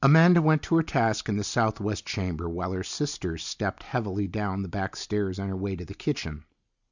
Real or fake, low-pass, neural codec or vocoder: real; 7.2 kHz; none